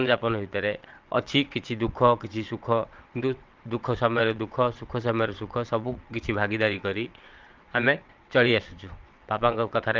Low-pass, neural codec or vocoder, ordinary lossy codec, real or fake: 7.2 kHz; vocoder, 22.05 kHz, 80 mel bands, WaveNeXt; Opus, 24 kbps; fake